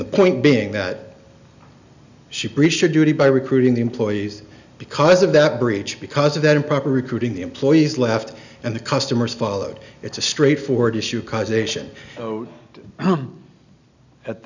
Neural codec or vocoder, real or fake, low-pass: none; real; 7.2 kHz